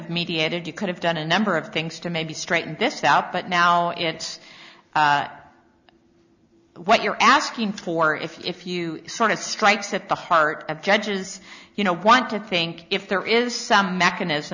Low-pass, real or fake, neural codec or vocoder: 7.2 kHz; real; none